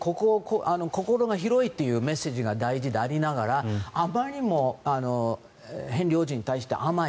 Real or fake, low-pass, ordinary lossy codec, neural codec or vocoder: real; none; none; none